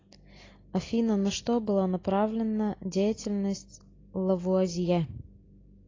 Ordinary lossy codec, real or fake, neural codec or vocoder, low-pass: AAC, 32 kbps; real; none; 7.2 kHz